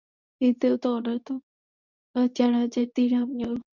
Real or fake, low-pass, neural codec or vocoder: fake; 7.2 kHz; codec, 24 kHz, 0.9 kbps, WavTokenizer, medium speech release version 2